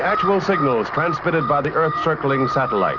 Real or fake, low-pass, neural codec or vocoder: real; 7.2 kHz; none